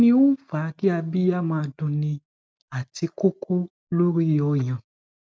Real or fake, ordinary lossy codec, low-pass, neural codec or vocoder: real; none; none; none